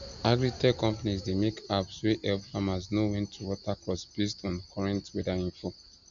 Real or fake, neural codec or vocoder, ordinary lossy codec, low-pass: real; none; MP3, 64 kbps; 7.2 kHz